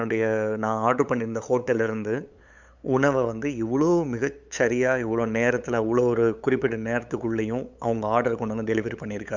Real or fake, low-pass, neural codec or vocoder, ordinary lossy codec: fake; 7.2 kHz; codec, 16 kHz, 8 kbps, FunCodec, trained on LibriTTS, 25 frames a second; Opus, 64 kbps